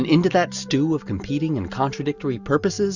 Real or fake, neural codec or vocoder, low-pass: real; none; 7.2 kHz